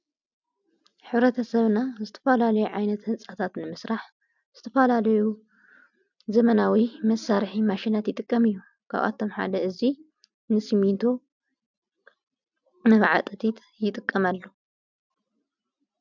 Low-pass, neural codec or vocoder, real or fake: 7.2 kHz; none; real